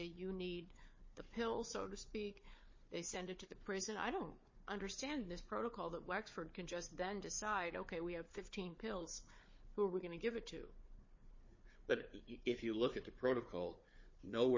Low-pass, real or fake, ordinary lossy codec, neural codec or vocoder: 7.2 kHz; fake; MP3, 32 kbps; codec, 16 kHz, 4 kbps, FunCodec, trained on Chinese and English, 50 frames a second